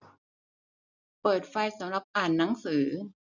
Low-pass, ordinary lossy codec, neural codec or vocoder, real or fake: 7.2 kHz; none; none; real